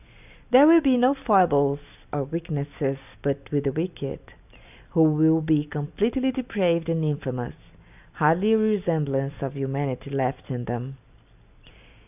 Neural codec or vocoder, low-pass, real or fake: none; 3.6 kHz; real